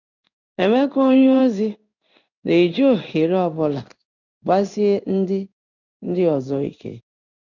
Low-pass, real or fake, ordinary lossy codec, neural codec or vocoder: 7.2 kHz; fake; none; codec, 16 kHz in and 24 kHz out, 1 kbps, XY-Tokenizer